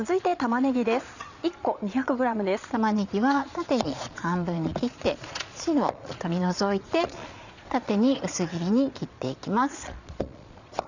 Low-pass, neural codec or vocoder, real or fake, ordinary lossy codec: 7.2 kHz; vocoder, 22.05 kHz, 80 mel bands, Vocos; fake; none